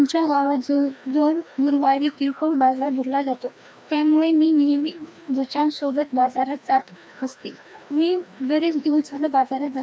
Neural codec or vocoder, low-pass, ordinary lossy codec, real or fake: codec, 16 kHz, 1 kbps, FreqCodec, larger model; none; none; fake